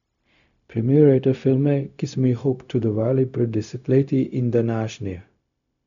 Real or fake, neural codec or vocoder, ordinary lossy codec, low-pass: fake; codec, 16 kHz, 0.4 kbps, LongCat-Audio-Codec; none; 7.2 kHz